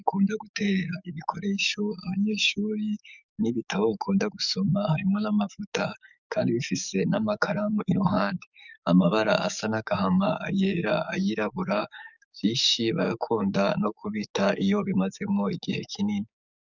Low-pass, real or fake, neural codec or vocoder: 7.2 kHz; fake; codec, 44.1 kHz, 7.8 kbps, DAC